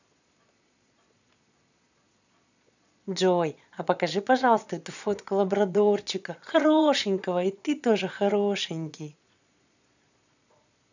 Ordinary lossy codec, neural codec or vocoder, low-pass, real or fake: none; vocoder, 22.05 kHz, 80 mel bands, Vocos; 7.2 kHz; fake